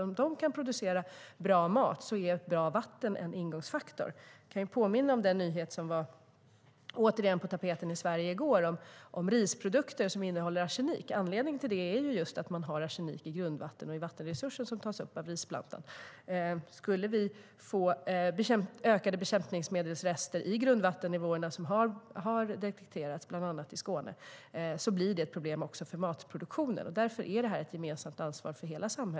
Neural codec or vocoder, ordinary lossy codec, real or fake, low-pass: none; none; real; none